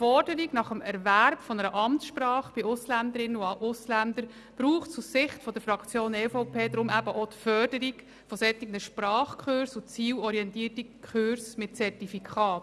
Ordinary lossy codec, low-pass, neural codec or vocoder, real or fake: none; none; none; real